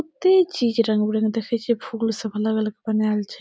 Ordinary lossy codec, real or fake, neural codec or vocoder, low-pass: none; real; none; none